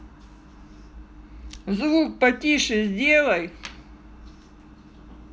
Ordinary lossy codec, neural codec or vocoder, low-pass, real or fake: none; none; none; real